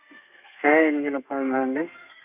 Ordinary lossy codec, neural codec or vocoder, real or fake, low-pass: none; codec, 32 kHz, 1.9 kbps, SNAC; fake; 3.6 kHz